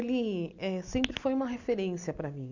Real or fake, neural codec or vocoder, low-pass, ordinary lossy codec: real; none; 7.2 kHz; none